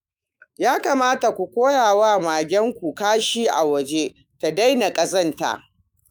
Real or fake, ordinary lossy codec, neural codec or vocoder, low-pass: fake; none; autoencoder, 48 kHz, 128 numbers a frame, DAC-VAE, trained on Japanese speech; none